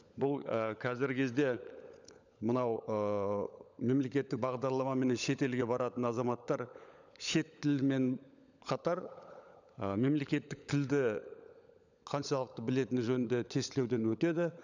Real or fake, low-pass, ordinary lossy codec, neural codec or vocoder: fake; 7.2 kHz; none; codec, 16 kHz, 16 kbps, FunCodec, trained on LibriTTS, 50 frames a second